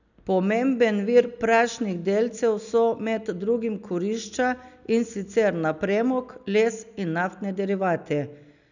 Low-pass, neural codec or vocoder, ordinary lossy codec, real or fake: 7.2 kHz; none; none; real